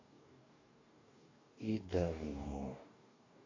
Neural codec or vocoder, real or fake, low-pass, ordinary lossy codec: codec, 44.1 kHz, 2.6 kbps, DAC; fake; 7.2 kHz; AAC, 32 kbps